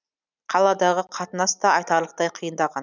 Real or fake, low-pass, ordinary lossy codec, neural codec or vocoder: real; 7.2 kHz; none; none